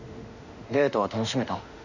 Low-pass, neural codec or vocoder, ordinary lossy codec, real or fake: 7.2 kHz; autoencoder, 48 kHz, 32 numbers a frame, DAC-VAE, trained on Japanese speech; none; fake